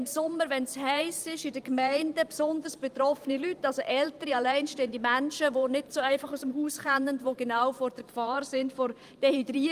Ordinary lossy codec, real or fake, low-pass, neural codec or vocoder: Opus, 32 kbps; fake; 14.4 kHz; vocoder, 44.1 kHz, 128 mel bands every 512 samples, BigVGAN v2